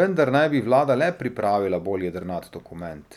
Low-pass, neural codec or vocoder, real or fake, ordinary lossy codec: 19.8 kHz; none; real; none